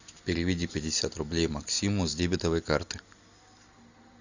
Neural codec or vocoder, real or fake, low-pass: none; real; 7.2 kHz